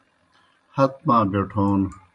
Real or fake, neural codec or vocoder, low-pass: fake; vocoder, 44.1 kHz, 128 mel bands every 512 samples, BigVGAN v2; 10.8 kHz